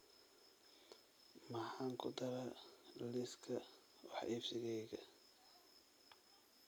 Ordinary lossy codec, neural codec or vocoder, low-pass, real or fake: none; none; none; real